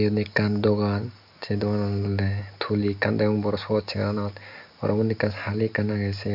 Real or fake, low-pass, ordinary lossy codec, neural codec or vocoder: real; 5.4 kHz; none; none